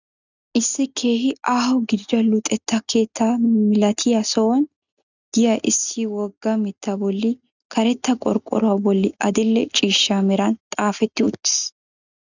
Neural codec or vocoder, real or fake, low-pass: none; real; 7.2 kHz